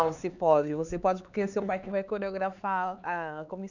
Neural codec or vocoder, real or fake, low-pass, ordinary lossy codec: codec, 16 kHz, 4 kbps, X-Codec, HuBERT features, trained on LibriSpeech; fake; 7.2 kHz; none